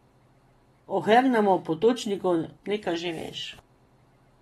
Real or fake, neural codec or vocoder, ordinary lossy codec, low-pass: real; none; AAC, 32 kbps; 14.4 kHz